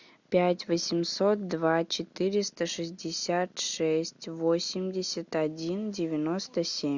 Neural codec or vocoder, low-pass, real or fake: none; 7.2 kHz; real